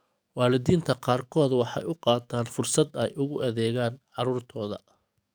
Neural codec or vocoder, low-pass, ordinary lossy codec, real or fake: codec, 44.1 kHz, 7.8 kbps, DAC; none; none; fake